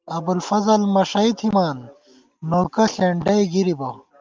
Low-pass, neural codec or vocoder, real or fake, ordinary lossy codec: 7.2 kHz; none; real; Opus, 24 kbps